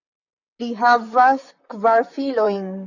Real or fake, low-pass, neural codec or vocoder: fake; 7.2 kHz; vocoder, 44.1 kHz, 128 mel bands, Pupu-Vocoder